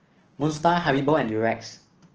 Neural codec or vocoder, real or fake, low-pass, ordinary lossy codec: codec, 16 kHz, 6 kbps, DAC; fake; 7.2 kHz; Opus, 16 kbps